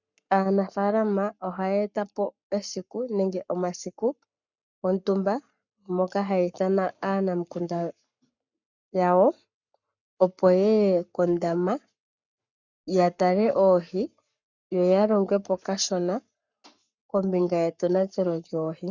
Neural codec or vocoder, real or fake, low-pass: codec, 44.1 kHz, 7.8 kbps, Pupu-Codec; fake; 7.2 kHz